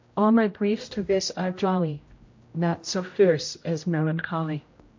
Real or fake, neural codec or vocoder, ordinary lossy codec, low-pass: fake; codec, 16 kHz, 0.5 kbps, X-Codec, HuBERT features, trained on general audio; MP3, 48 kbps; 7.2 kHz